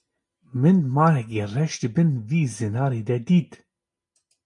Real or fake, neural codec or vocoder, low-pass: real; none; 10.8 kHz